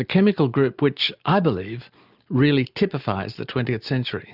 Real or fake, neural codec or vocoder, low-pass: real; none; 5.4 kHz